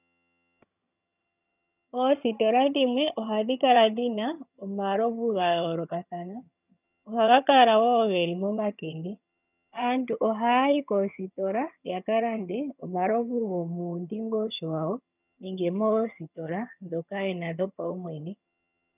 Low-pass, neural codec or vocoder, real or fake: 3.6 kHz; vocoder, 22.05 kHz, 80 mel bands, HiFi-GAN; fake